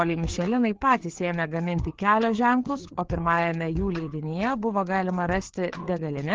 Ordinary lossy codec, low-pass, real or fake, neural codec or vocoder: Opus, 16 kbps; 7.2 kHz; fake; codec, 16 kHz, 4 kbps, FreqCodec, larger model